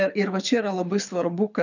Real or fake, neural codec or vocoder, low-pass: fake; codec, 24 kHz, 6 kbps, HILCodec; 7.2 kHz